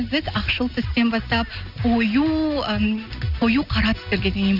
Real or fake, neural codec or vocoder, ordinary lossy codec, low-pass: real; none; none; 5.4 kHz